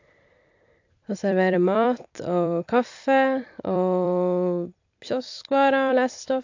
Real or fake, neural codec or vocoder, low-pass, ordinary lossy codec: fake; vocoder, 44.1 kHz, 128 mel bands every 256 samples, BigVGAN v2; 7.2 kHz; AAC, 48 kbps